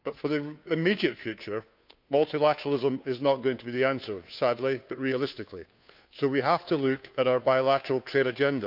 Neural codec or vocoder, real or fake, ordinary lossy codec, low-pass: codec, 16 kHz, 2 kbps, FunCodec, trained on Chinese and English, 25 frames a second; fake; none; 5.4 kHz